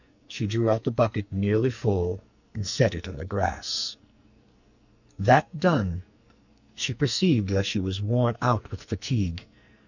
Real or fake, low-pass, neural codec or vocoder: fake; 7.2 kHz; codec, 44.1 kHz, 2.6 kbps, SNAC